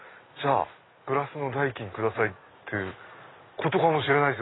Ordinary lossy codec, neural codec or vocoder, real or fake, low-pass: AAC, 16 kbps; none; real; 7.2 kHz